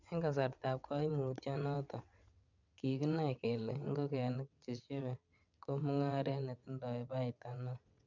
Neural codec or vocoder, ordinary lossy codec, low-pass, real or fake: vocoder, 22.05 kHz, 80 mel bands, WaveNeXt; none; 7.2 kHz; fake